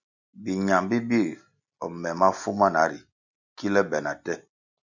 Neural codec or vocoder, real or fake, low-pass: none; real; 7.2 kHz